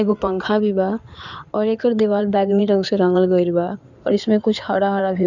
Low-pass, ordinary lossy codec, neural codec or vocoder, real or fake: 7.2 kHz; none; codec, 16 kHz in and 24 kHz out, 2.2 kbps, FireRedTTS-2 codec; fake